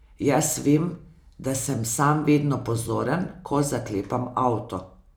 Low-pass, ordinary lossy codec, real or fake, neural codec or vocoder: none; none; real; none